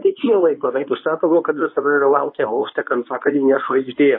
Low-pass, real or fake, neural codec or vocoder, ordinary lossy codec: 5.4 kHz; fake; codec, 24 kHz, 0.9 kbps, WavTokenizer, medium speech release version 1; MP3, 24 kbps